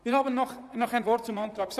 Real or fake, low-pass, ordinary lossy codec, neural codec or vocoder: fake; 14.4 kHz; none; vocoder, 44.1 kHz, 128 mel bands, Pupu-Vocoder